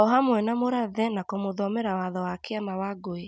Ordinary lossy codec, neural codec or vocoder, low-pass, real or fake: none; none; none; real